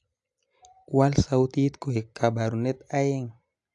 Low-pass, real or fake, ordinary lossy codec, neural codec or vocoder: 10.8 kHz; real; AAC, 64 kbps; none